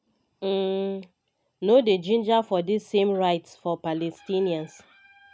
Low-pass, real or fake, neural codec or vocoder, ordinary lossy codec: none; real; none; none